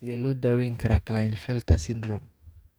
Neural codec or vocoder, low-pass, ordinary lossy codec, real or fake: codec, 44.1 kHz, 2.6 kbps, DAC; none; none; fake